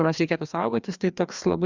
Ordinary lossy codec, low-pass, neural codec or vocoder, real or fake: Opus, 64 kbps; 7.2 kHz; codec, 16 kHz, 2 kbps, FreqCodec, larger model; fake